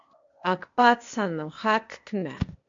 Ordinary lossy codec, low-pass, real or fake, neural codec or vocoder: MP3, 48 kbps; 7.2 kHz; fake; codec, 16 kHz, 0.8 kbps, ZipCodec